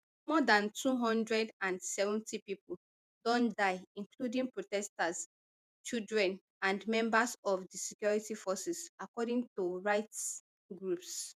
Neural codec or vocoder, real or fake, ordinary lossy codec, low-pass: vocoder, 48 kHz, 128 mel bands, Vocos; fake; none; 14.4 kHz